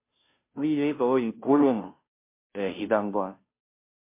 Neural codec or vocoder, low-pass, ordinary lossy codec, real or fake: codec, 16 kHz, 0.5 kbps, FunCodec, trained on Chinese and English, 25 frames a second; 3.6 kHz; MP3, 24 kbps; fake